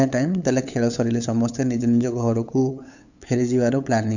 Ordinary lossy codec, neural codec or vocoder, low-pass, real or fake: none; codec, 16 kHz, 8 kbps, FunCodec, trained on LibriTTS, 25 frames a second; 7.2 kHz; fake